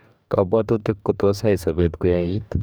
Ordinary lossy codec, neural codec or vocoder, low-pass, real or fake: none; codec, 44.1 kHz, 2.6 kbps, DAC; none; fake